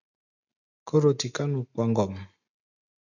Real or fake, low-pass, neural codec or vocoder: real; 7.2 kHz; none